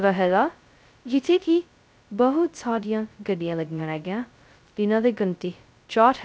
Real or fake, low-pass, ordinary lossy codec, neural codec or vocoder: fake; none; none; codec, 16 kHz, 0.2 kbps, FocalCodec